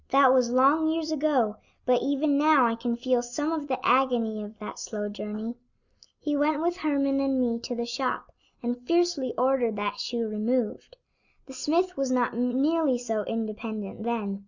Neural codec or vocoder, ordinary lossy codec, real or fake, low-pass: none; Opus, 64 kbps; real; 7.2 kHz